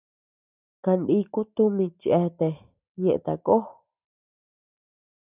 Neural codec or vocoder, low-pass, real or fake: none; 3.6 kHz; real